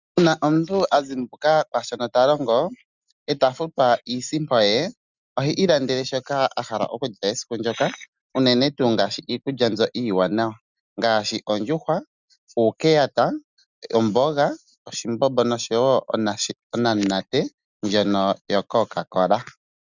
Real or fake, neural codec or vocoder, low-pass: real; none; 7.2 kHz